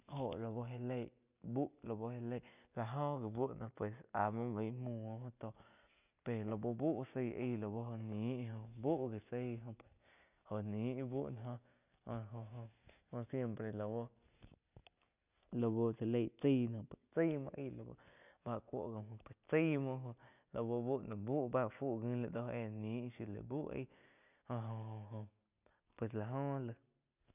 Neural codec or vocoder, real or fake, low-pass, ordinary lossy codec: none; real; 3.6 kHz; none